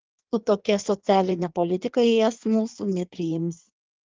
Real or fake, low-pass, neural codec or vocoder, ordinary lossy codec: fake; 7.2 kHz; codec, 16 kHz in and 24 kHz out, 1.1 kbps, FireRedTTS-2 codec; Opus, 32 kbps